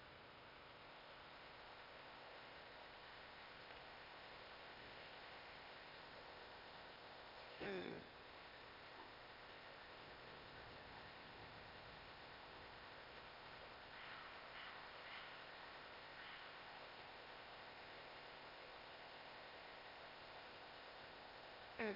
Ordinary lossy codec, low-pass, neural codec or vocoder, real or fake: MP3, 32 kbps; 5.4 kHz; codec, 16 kHz, 0.8 kbps, ZipCodec; fake